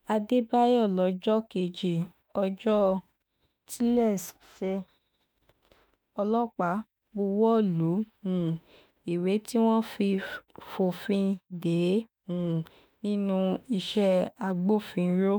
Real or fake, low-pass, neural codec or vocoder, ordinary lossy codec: fake; none; autoencoder, 48 kHz, 32 numbers a frame, DAC-VAE, trained on Japanese speech; none